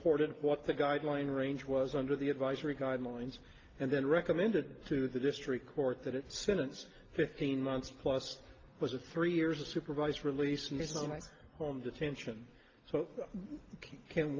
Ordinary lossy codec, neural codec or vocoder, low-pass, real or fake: Opus, 32 kbps; none; 7.2 kHz; real